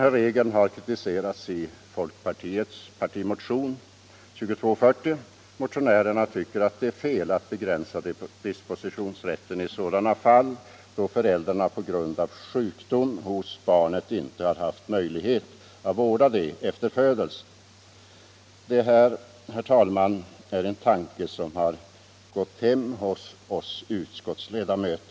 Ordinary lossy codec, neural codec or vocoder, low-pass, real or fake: none; none; none; real